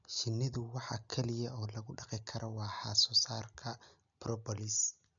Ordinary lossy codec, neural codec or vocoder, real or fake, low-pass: none; none; real; 7.2 kHz